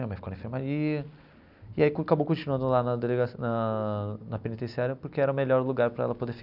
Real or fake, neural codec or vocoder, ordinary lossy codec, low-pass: real; none; none; 5.4 kHz